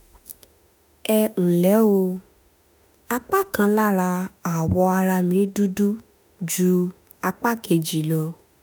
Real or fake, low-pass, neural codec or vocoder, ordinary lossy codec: fake; none; autoencoder, 48 kHz, 32 numbers a frame, DAC-VAE, trained on Japanese speech; none